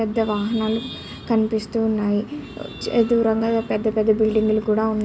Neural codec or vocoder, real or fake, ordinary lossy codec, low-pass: none; real; none; none